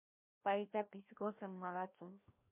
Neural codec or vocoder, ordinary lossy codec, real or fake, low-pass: codec, 16 kHz, 1 kbps, FreqCodec, larger model; MP3, 24 kbps; fake; 3.6 kHz